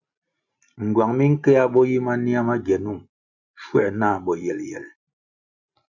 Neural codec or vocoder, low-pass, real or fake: none; 7.2 kHz; real